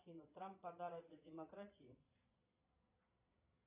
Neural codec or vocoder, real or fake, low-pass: vocoder, 22.05 kHz, 80 mel bands, Vocos; fake; 3.6 kHz